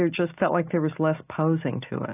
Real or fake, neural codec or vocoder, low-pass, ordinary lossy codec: real; none; 3.6 kHz; AAC, 24 kbps